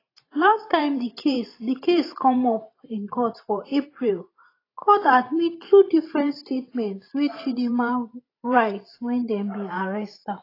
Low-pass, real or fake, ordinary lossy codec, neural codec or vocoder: 5.4 kHz; fake; AAC, 24 kbps; vocoder, 44.1 kHz, 128 mel bands every 512 samples, BigVGAN v2